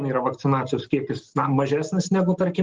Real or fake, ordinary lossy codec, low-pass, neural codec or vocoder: real; Opus, 16 kbps; 7.2 kHz; none